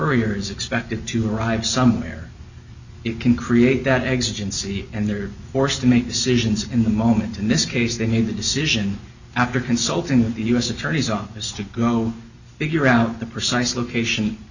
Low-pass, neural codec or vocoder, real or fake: 7.2 kHz; none; real